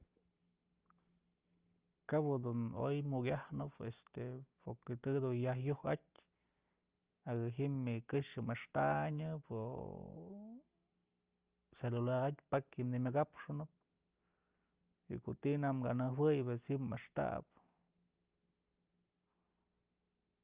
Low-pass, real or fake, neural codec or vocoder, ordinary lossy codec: 3.6 kHz; real; none; Opus, 24 kbps